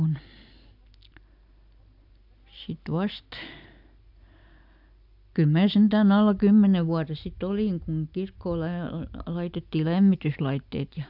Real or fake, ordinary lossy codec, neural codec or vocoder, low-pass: real; none; none; 5.4 kHz